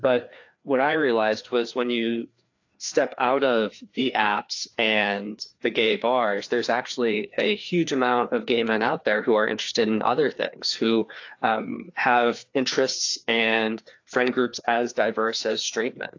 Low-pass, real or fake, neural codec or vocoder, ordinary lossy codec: 7.2 kHz; fake; codec, 16 kHz, 2 kbps, FreqCodec, larger model; AAC, 48 kbps